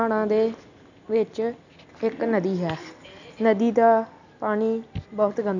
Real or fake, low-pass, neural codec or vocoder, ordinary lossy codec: real; 7.2 kHz; none; none